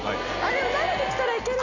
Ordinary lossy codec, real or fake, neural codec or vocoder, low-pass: none; real; none; 7.2 kHz